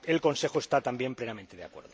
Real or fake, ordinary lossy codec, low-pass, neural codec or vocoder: real; none; none; none